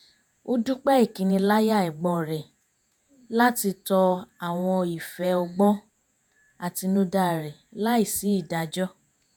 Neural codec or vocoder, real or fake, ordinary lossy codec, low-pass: vocoder, 48 kHz, 128 mel bands, Vocos; fake; none; none